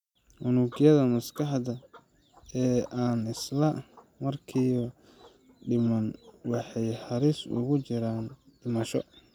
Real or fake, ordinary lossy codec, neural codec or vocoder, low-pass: real; none; none; 19.8 kHz